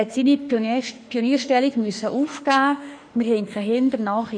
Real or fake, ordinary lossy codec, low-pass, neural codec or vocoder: fake; none; 9.9 kHz; autoencoder, 48 kHz, 32 numbers a frame, DAC-VAE, trained on Japanese speech